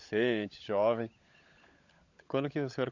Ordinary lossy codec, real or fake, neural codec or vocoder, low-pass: Opus, 64 kbps; fake; codec, 16 kHz, 16 kbps, FunCodec, trained on LibriTTS, 50 frames a second; 7.2 kHz